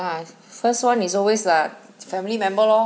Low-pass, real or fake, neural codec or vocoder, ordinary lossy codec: none; real; none; none